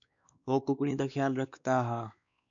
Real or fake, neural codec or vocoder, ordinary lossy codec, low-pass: fake; codec, 16 kHz, 2 kbps, X-Codec, WavLM features, trained on Multilingual LibriSpeech; MP3, 96 kbps; 7.2 kHz